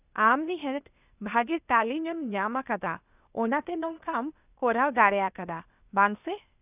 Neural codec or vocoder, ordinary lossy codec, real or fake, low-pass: codec, 16 kHz, 0.8 kbps, ZipCodec; none; fake; 3.6 kHz